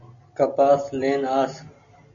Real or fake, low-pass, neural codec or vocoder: real; 7.2 kHz; none